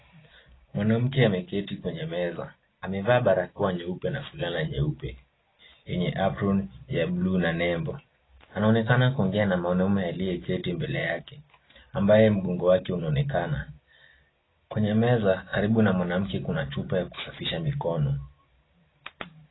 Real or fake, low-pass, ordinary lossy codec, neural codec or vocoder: real; 7.2 kHz; AAC, 16 kbps; none